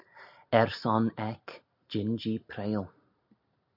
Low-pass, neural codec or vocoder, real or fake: 5.4 kHz; none; real